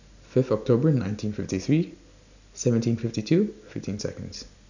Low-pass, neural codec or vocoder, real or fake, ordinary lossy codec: 7.2 kHz; none; real; none